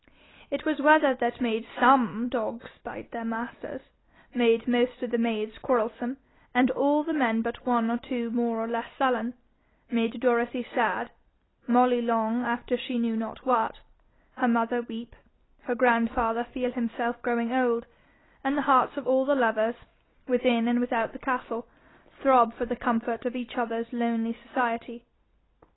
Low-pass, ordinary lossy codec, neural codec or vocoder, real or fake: 7.2 kHz; AAC, 16 kbps; none; real